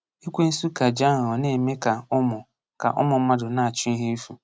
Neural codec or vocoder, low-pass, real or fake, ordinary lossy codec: none; none; real; none